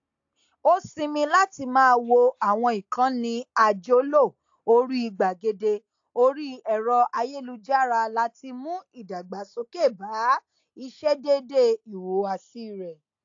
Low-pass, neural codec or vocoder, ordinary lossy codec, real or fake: 7.2 kHz; none; AAC, 48 kbps; real